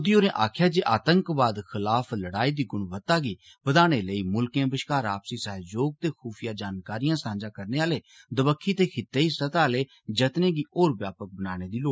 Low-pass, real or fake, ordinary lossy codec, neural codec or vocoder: none; real; none; none